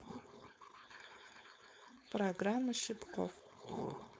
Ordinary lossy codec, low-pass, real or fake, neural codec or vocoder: none; none; fake; codec, 16 kHz, 4.8 kbps, FACodec